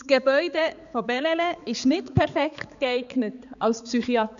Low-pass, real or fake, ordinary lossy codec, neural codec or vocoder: 7.2 kHz; fake; none; codec, 16 kHz, 4 kbps, X-Codec, HuBERT features, trained on balanced general audio